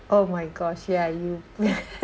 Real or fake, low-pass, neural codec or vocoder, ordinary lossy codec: real; none; none; none